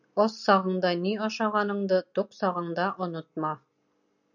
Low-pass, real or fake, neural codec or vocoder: 7.2 kHz; real; none